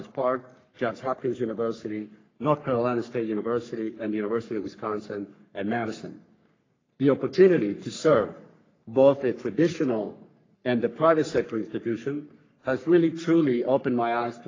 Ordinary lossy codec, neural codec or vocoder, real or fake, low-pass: AAC, 32 kbps; codec, 44.1 kHz, 3.4 kbps, Pupu-Codec; fake; 7.2 kHz